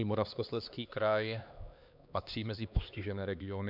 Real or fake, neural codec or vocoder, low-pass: fake; codec, 16 kHz, 2 kbps, X-Codec, HuBERT features, trained on LibriSpeech; 5.4 kHz